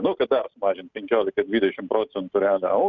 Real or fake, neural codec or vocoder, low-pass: real; none; 7.2 kHz